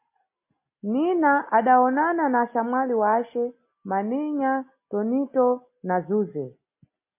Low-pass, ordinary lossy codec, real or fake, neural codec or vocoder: 3.6 kHz; MP3, 24 kbps; real; none